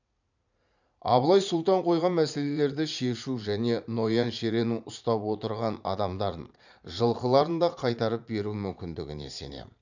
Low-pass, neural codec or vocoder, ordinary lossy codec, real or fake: 7.2 kHz; vocoder, 44.1 kHz, 80 mel bands, Vocos; none; fake